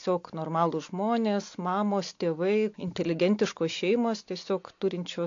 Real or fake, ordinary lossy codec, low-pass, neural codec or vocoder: real; AAC, 48 kbps; 7.2 kHz; none